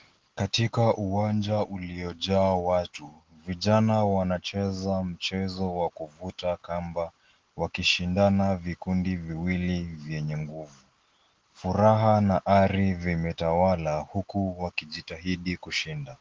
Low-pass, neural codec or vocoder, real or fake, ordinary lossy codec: 7.2 kHz; none; real; Opus, 16 kbps